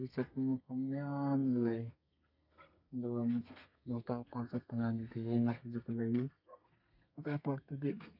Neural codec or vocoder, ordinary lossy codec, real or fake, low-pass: codec, 32 kHz, 1.9 kbps, SNAC; none; fake; 5.4 kHz